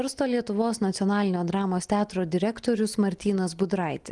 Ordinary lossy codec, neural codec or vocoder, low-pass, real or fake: Opus, 24 kbps; none; 10.8 kHz; real